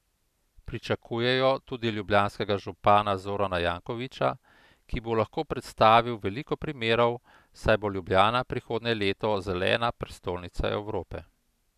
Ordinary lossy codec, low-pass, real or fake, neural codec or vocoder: none; 14.4 kHz; real; none